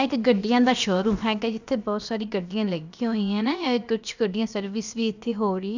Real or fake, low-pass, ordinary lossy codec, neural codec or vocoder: fake; 7.2 kHz; none; codec, 16 kHz, about 1 kbps, DyCAST, with the encoder's durations